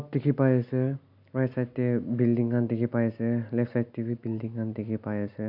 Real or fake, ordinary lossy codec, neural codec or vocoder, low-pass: real; AAC, 48 kbps; none; 5.4 kHz